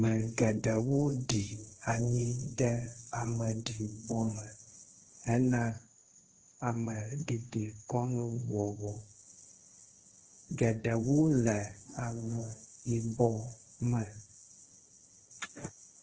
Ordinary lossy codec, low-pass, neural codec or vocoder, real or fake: Opus, 16 kbps; 7.2 kHz; codec, 16 kHz, 1.1 kbps, Voila-Tokenizer; fake